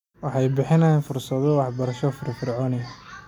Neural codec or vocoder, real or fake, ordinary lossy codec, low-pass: none; real; none; 19.8 kHz